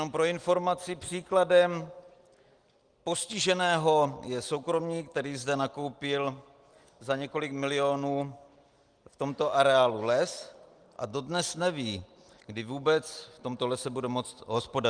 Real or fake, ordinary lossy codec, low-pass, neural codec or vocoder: real; Opus, 24 kbps; 9.9 kHz; none